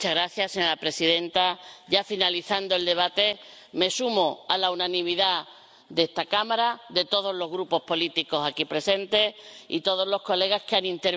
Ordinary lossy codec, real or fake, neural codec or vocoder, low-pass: none; real; none; none